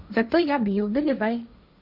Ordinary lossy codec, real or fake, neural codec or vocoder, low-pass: none; fake; codec, 16 kHz, 1.1 kbps, Voila-Tokenizer; 5.4 kHz